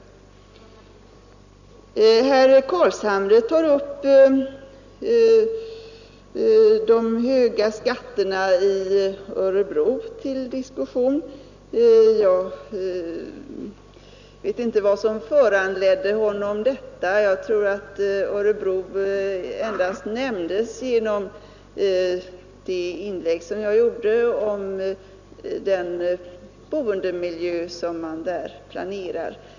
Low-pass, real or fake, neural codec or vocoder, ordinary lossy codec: 7.2 kHz; real; none; none